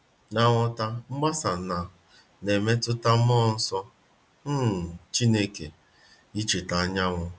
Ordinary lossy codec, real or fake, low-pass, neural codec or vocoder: none; real; none; none